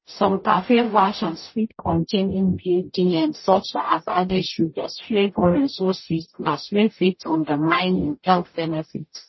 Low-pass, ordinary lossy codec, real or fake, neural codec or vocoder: 7.2 kHz; MP3, 24 kbps; fake; codec, 44.1 kHz, 0.9 kbps, DAC